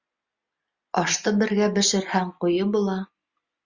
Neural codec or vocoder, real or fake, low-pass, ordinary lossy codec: none; real; 7.2 kHz; Opus, 64 kbps